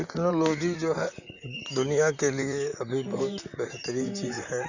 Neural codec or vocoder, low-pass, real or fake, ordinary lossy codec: vocoder, 44.1 kHz, 128 mel bands, Pupu-Vocoder; 7.2 kHz; fake; none